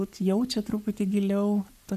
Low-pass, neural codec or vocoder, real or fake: 14.4 kHz; codec, 44.1 kHz, 7.8 kbps, Pupu-Codec; fake